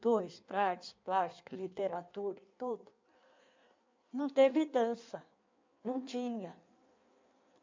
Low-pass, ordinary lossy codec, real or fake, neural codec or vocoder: 7.2 kHz; MP3, 64 kbps; fake; codec, 16 kHz in and 24 kHz out, 1.1 kbps, FireRedTTS-2 codec